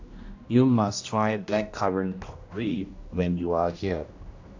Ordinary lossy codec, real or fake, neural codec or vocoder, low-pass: AAC, 48 kbps; fake; codec, 16 kHz, 1 kbps, X-Codec, HuBERT features, trained on general audio; 7.2 kHz